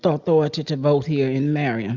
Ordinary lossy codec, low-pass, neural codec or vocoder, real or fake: Opus, 64 kbps; 7.2 kHz; none; real